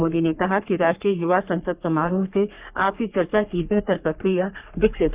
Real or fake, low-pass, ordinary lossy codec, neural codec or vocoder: fake; 3.6 kHz; Opus, 64 kbps; codec, 44.1 kHz, 3.4 kbps, Pupu-Codec